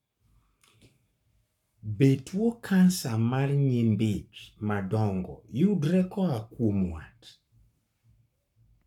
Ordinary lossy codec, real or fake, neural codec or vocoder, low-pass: none; fake; codec, 44.1 kHz, 7.8 kbps, Pupu-Codec; 19.8 kHz